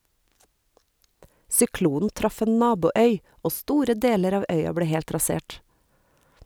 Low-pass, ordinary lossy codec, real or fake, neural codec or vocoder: none; none; real; none